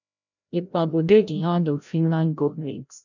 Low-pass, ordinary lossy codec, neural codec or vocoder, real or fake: 7.2 kHz; none; codec, 16 kHz, 0.5 kbps, FreqCodec, larger model; fake